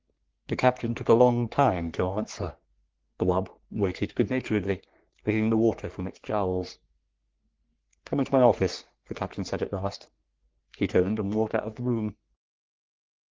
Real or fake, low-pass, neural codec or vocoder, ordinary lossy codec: fake; 7.2 kHz; codec, 44.1 kHz, 3.4 kbps, Pupu-Codec; Opus, 16 kbps